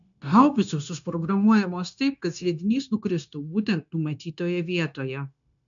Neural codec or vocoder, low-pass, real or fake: codec, 16 kHz, 0.9 kbps, LongCat-Audio-Codec; 7.2 kHz; fake